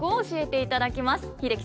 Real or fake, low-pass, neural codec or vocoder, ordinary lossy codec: real; none; none; none